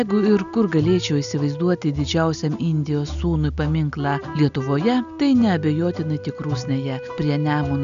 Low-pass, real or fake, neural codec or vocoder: 7.2 kHz; real; none